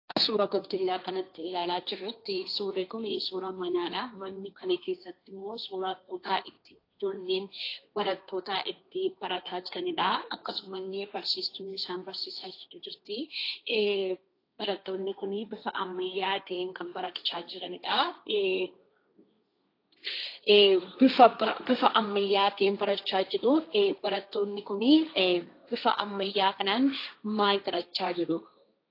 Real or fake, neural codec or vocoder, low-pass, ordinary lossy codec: fake; codec, 16 kHz, 1.1 kbps, Voila-Tokenizer; 5.4 kHz; AAC, 32 kbps